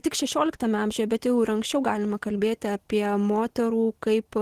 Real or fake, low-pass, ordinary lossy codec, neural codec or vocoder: real; 14.4 kHz; Opus, 16 kbps; none